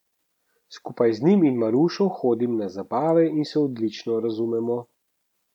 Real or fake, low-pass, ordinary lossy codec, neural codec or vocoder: real; 19.8 kHz; none; none